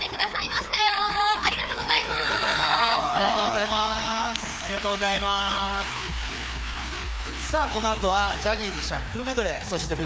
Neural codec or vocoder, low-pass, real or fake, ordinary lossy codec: codec, 16 kHz, 2 kbps, FreqCodec, larger model; none; fake; none